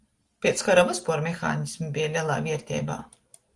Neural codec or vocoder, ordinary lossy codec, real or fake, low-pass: none; Opus, 24 kbps; real; 10.8 kHz